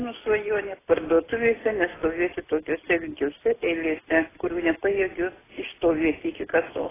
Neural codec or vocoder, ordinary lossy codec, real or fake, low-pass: none; AAC, 16 kbps; real; 3.6 kHz